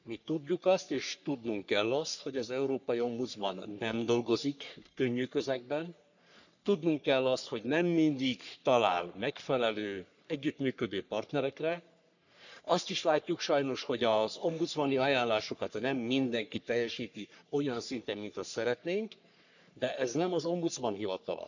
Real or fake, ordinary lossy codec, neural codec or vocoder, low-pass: fake; none; codec, 44.1 kHz, 3.4 kbps, Pupu-Codec; 7.2 kHz